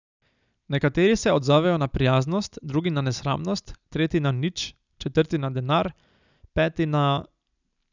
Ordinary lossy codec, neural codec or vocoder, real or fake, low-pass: none; none; real; 7.2 kHz